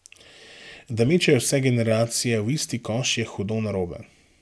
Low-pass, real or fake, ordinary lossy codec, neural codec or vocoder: none; real; none; none